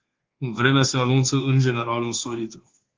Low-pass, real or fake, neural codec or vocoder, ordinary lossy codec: 7.2 kHz; fake; codec, 24 kHz, 1.2 kbps, DualCodec; Opus, 16 kbps